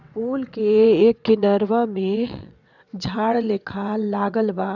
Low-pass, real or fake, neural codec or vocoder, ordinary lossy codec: 7.2 kHz; fake; vocoder, 22.05 kHz, 80 mel bands, WaveNeXt; none